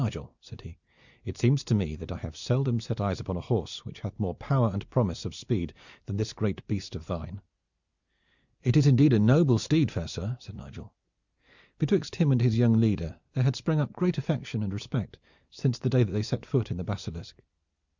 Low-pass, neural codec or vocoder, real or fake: 7.2 kHz; none; real